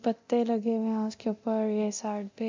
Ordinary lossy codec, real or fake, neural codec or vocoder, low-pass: MP3, 64 kbps; fake; codec, 24 kHz, 0.9 kbps, DualCodec; 7.2 kHz